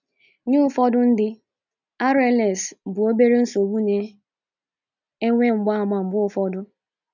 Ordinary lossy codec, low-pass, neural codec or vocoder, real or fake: none; 7.2 kHz; none; real